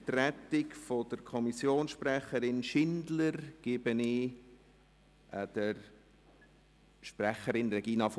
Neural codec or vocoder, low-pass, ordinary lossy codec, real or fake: none; none; none; real